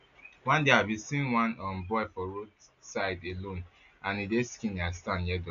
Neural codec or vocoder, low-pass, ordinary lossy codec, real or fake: none; 7.2 kHz; none; real